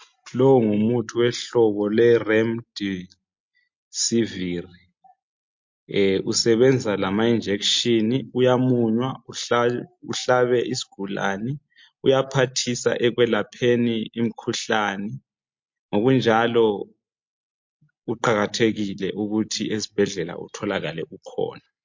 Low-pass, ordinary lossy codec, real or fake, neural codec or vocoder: 7.2 kHz; MP3, 48 kbps; real; none